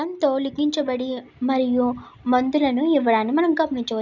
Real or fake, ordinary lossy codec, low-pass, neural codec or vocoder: real; none; 7.2 kHz; none